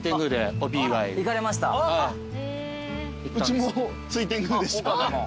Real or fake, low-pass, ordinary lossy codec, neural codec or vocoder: real; none; none; none